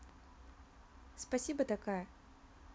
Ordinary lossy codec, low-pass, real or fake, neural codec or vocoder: none; none; real; none